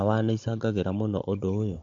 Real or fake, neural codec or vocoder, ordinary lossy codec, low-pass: fake; codec, 16 kHz, 16 kbps, FunCodec, trained on Chinese and English, 50 frames a second; MP3, 48 kbps; 7.2 kHz